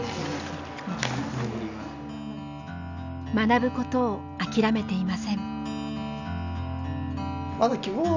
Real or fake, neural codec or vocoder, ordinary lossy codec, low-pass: real; none; none; 7.2 kHz